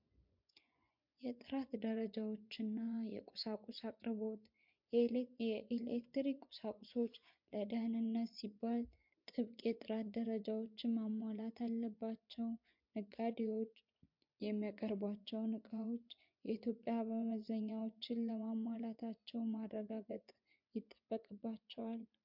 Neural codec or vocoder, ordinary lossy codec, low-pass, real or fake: vocoder, 24 kHz, 100 mel bands, Vocos; MP3, 48 kbps; 5.4 kHz; fake